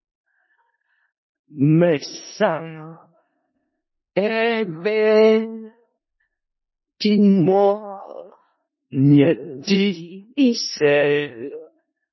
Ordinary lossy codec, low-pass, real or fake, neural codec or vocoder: MP3, 24 kbps; 7.2 kHz; fake; codec, 16 kHz in and 24 kHz out, 0.4 kbps, LongCat-Audio-Codec, four codebook decoder